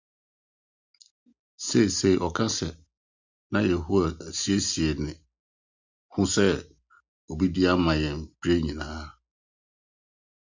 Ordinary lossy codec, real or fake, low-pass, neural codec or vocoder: Opus, 64 kbps; real; 7.2 kHz; none